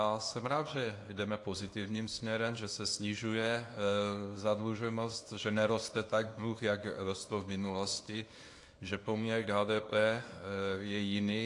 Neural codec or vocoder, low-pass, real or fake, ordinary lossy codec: codec, 24 kHz, 0.9 kbps, WavTokenizer, small release; 10.8 kHz; fake; AAC, 48 kbps